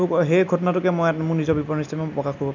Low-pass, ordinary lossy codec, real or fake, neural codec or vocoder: 7.2 kHz; none; real; none